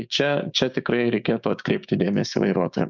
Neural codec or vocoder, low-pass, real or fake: codec, 16 kHz, 6 kbps, DAC; 7.2 kHz; fake